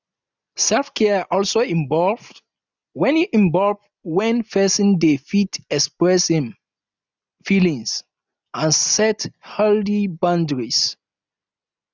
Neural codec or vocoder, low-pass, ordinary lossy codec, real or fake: none; 7.2 kHz; none; real